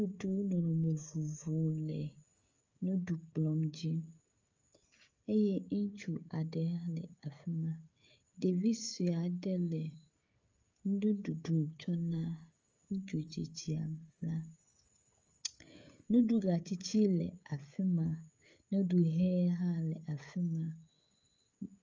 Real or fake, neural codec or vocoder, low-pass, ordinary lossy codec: fake; codec, 16 kHz, 16 kbps, FreqCodec, smaller model; 7.2 kHz; Opus, 32 kbps